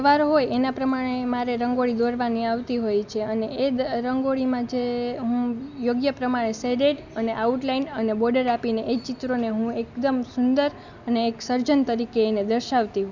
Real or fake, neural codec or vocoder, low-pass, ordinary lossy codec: real; none; 7.2 kHz; none